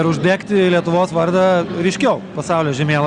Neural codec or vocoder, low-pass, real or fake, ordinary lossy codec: none; 9.9 kHz; real; Opus, 64 kbps